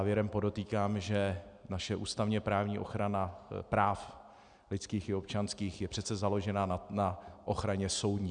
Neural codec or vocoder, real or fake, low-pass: none; real; 9.9 kHz